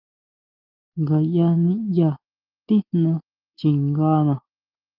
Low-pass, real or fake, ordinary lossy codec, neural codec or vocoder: 5.4 kHz; real; Opus, 32 kbps; none